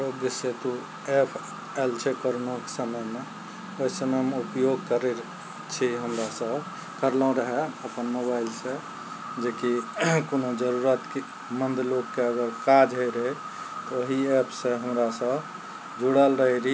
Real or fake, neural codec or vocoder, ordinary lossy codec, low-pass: real; none; none; none